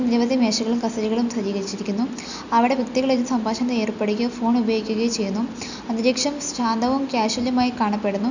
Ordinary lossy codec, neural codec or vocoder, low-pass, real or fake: none; none; 7.2 kHz; real